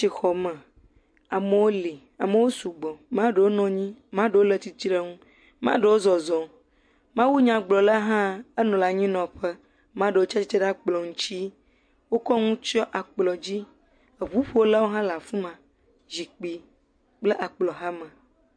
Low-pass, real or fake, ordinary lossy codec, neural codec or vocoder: 9.9 kHz; real; MP3, 48 kbps; none